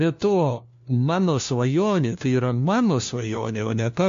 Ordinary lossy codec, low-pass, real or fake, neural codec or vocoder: MP3, 48 kbps; 7.2 kHz; fake; codec, 16 kHz, 1 kbps, FunCodec, trained on LibriTTS, 50 frames a second